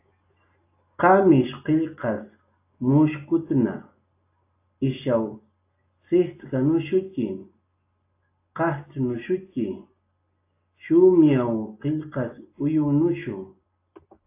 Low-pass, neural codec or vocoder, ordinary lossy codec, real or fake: 3.6 kHz; none; AAC, 24 kbps; real